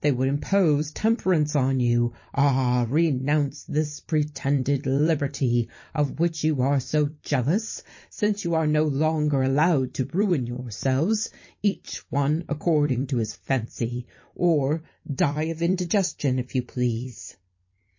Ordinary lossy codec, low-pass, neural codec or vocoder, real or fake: MP3, 32 kbps; 7.2 kHz; vocoder, 44.1 kHz, 128 mel bands every 256 samples, BigVGAN v2; fake